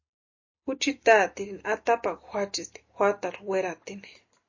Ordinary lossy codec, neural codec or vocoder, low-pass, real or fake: MP3, 32 kbps; none; 7.2 kHz; real